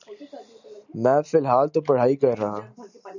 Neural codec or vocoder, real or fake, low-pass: none; real; 7.2 kHz